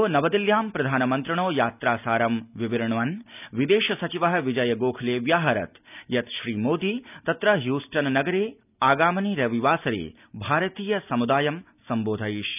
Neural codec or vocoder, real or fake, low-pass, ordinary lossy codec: none; real; 3.6 kHz; none